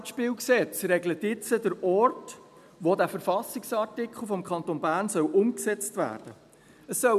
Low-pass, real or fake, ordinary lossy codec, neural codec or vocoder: 14.4 kHz; real; none; none